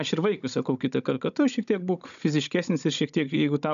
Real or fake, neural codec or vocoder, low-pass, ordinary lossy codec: fake; codec, 16 kHz, 16 kbps, FunCodec, trained on Chinese and English, 50 frames a second; 7.2 kHz; MP3, 96 kbps